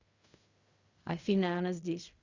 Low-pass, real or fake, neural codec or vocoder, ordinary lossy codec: 7.2 kHz; fake; codec, 16 kHz in and 24 kHz out, 0.4 kbps, LongCat-Audio-Codec, fine tuned four codebook decoder; Opus, 64 kbps